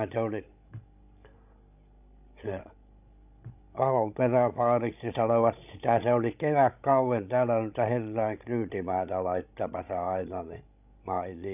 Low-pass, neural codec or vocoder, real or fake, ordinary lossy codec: 3.6 kHz; codec, 16 kHz, 16 kbps, FreqCodec, larger model; fake; none